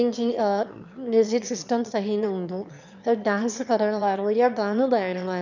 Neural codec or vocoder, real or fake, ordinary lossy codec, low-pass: autoencoder, 22.05 kHz, a latent of 192 numbers a frame, VITS, trained on one speaker; fake; none; 7.2 kHz